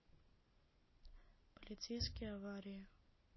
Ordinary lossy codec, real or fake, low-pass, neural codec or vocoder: MP3, 24 kbps; real; 7.2 kHz; none